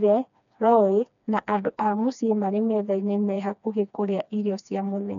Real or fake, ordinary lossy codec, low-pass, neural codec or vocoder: fake; none; 7.2 kHz; codec, 16 kHz, 2 kbps, FreqCodec, smaller model